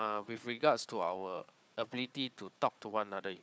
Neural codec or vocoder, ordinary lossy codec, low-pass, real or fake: codec, 16 kHz, 4 kbps, FunCodec, trained on Chinese and English, 50 frames a second; none; none; fake